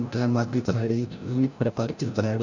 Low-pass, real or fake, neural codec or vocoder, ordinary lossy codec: 7.2 kHz; fake; codec, 16 kHz, 0.5 kbps, FreqCodec, larger model; none